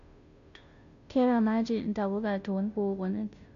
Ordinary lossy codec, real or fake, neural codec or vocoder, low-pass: none; fake; codec, 16 kHz, 0.5 kbps, FunCodec, trained on Chinese and English, 25 frames a second; 7.2 kHz